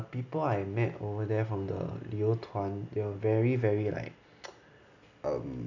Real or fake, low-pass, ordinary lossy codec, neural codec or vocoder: real; 7.2 kHz; none; none